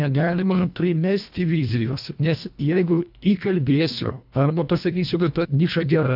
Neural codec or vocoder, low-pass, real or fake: codec, 24 kHz, 1.5 kbps, HILCodec; 5.4 kHz; fake